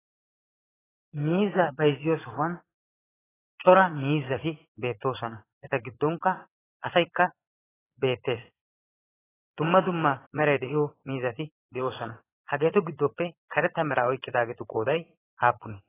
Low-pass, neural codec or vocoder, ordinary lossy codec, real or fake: 3.6 kHz; vocoder, 22.05 kHz, 80 mel bands, Vocos; AAC, 16 kbps; fake